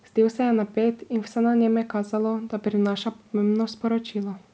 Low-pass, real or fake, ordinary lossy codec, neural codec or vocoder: none; real; none; none